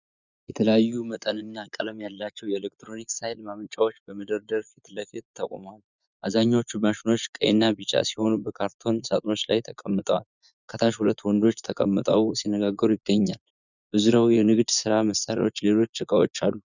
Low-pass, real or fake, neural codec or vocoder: 7.2 kHz; fake; vocoder, 24 kHz, 100 mel bands, Vocos